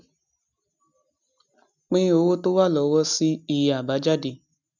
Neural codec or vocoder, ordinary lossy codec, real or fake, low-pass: none; none; real; 7.2 kHz